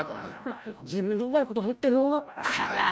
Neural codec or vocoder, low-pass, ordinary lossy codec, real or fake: codec, 16 kHz, 0.5 kbps, FreqCodec, larger model; none; none; fake